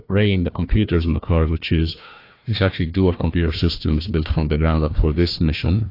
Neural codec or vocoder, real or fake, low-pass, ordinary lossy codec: codec, 16 kHz, 1 kbps, FunCodec, trained on Chinese and English, 50 frames a second; fake; 5.4 kHz; AAC, 32 kbps